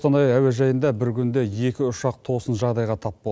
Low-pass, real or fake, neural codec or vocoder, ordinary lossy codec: none; real; none; none